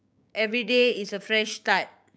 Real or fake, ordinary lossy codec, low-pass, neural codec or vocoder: fake; none; none; codec, 16 kHz, 6 kbps, DAC